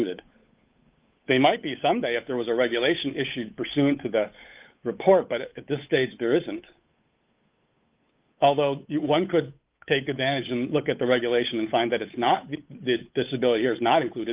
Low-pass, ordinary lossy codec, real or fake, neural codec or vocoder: 3.6 kHz; Opus, 24 kbps; fake; codec, 16 kHz, 8 kbps, FunCodec, trained on Chinese and English, 25 frames a second